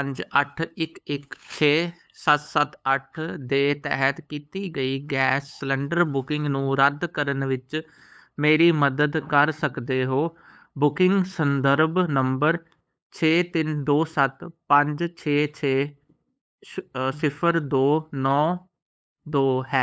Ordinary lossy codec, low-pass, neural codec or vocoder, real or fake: none; none; codec, 16 kHz, 8 kbps, FunCodec, trained on LibriTTS, 25 frames a second; fake